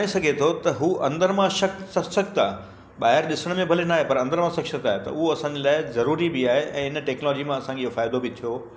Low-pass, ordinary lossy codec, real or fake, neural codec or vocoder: none; none; real; none